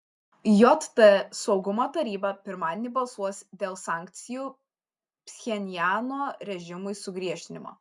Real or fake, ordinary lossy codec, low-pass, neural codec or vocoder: real; MP3, 96 kbps; 10.8 kHz; none